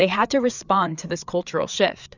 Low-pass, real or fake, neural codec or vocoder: 7.2 kHz; fake; codec, 16 kHz, 4 kbps, FreqCodec, larger model